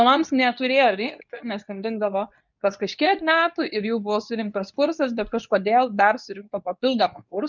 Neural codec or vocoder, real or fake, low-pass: codec, 24 kHz, 0.9 kbps, WavTokenizer, medium speech release version 2; fake; 7.2 kHz